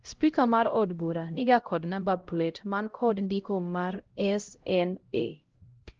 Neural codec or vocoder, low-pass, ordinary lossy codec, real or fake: codec, 16 kHz, 0.5 kbps, X-Codec, HuBERT features, trained on LibriSpeech; 7.2 kHz; Opus, 16 kbps; fake